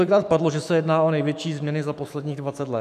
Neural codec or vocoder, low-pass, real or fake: autoencoder, 48 kHz, 128 numbers a frame, DAC-VAE, trained on Japanese speech; 14.4 kHz; fake